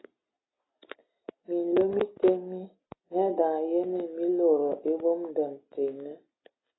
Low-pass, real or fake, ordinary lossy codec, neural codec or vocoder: 7.2 kHz; real; AAC, 16 kbps; none